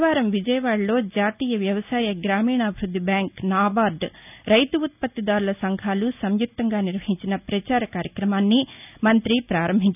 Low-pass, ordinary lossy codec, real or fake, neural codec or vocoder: 3.6 kHz; none; real; none